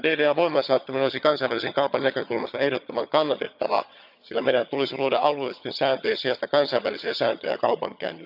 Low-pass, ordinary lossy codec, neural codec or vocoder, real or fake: 5.4 kHz; none; vocoder, 22.05 kHz, 80 mel bands, HiFi-GAN; fake